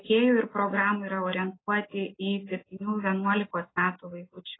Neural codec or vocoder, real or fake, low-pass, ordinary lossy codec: none; real; 7.2 kHz; AAC, 16 kbps